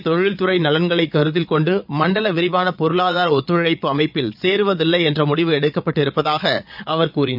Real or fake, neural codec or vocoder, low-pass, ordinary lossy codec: fake; vocoder, 22.05 kHz, 80 mel bands, Vocos; 5.4 kHz; none